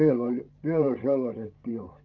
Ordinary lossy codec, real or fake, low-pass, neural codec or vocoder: Opus, 24 kbps; fake; 7.2 kHz; codec, 16 kHz, 16 kbps, FreqCodec, larger model